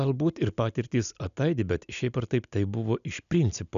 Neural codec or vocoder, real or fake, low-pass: none; real; 7.2 kHz